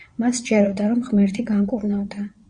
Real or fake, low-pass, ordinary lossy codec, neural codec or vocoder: fake; 9.9 kHz; Opus, 64 kbps; vocoder, 22.05 kHz, 80 mel bands, Vocos